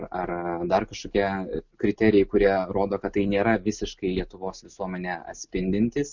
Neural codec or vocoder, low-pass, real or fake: none; 7.2 kHz; real